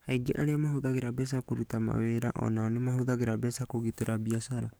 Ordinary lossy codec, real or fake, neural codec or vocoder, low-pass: none; fake; codec, 44.1 kHz, 7.8 kbps, DAC; none